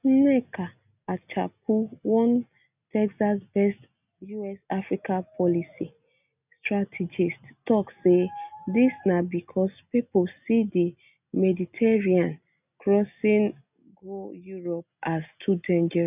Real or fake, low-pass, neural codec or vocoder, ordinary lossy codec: real; 3.6 kHz; none; none